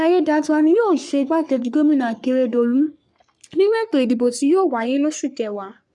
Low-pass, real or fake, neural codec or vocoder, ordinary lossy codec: 10.8 kHz; fake; codec, 44.1 kHz, 3.4 kbps, Pupu-Codec; none